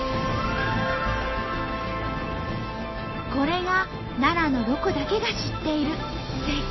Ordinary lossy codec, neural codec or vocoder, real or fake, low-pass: MP3, 24 kbps; none; real; 7.2 kHz